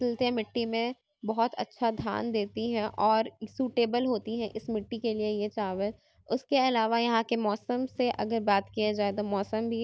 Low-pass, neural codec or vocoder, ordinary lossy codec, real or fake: none; none; none; real